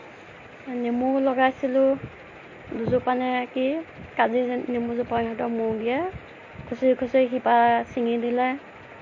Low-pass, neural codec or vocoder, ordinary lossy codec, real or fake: 7.2 kHz; none; MP3, 32 kbps; real